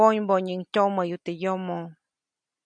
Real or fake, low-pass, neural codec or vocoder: real; 9.9 kHz; none